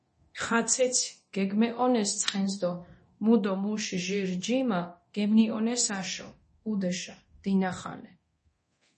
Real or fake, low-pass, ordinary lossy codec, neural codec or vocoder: fake; 10.8 kHz; MP3, 32 kbps; codec, 24 kHz, 0.9 kbps, DualCodec